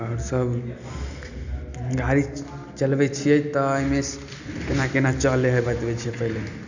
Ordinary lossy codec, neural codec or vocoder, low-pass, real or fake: none; none; 7.2 kHz; real